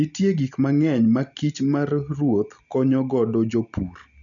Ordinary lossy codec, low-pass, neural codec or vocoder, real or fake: none; 7.2 kHz; none; real